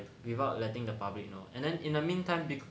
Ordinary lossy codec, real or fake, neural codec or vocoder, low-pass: none; real; none; none